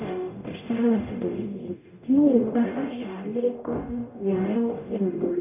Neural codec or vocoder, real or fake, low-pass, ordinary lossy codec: codec, 44.1 kHz, 0.9 kbps, DAC; fake; 3.6 kHz; none